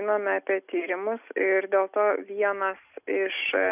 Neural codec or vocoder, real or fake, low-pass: none; real; 3.6 kHz